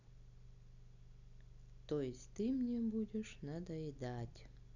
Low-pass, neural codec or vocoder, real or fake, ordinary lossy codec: 7.2 kHz; none; real; none